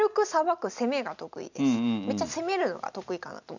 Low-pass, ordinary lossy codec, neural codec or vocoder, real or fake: 7.2 kHz; none; none; real